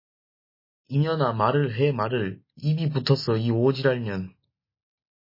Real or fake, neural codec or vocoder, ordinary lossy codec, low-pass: real; none; MP3, 24 kbps; 5.4 kHz